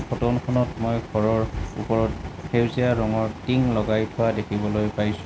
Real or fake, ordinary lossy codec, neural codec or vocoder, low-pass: real; none; none; none